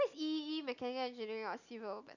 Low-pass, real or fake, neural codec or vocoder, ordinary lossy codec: 7.2 kHz; real; none; none